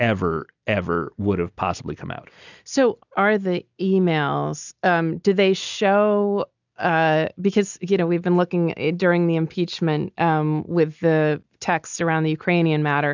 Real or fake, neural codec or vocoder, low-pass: real; none; 7.2 kHz